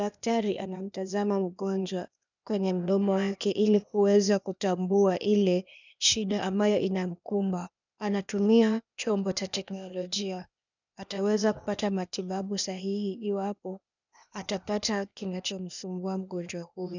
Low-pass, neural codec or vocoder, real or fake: 7.2 kHz; codec, 16 kHz, 0.8 kbps, ZipCodec; fake